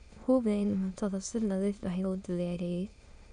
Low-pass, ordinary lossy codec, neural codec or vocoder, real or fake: 9.9 kHz; none; autoencoder, 22.05 kHz, a latent of 192 numbers a frame, VITS, trained on many speakers; fake